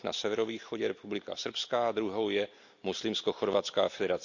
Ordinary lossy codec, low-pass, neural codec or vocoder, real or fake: none; 7.2 kHz; none; real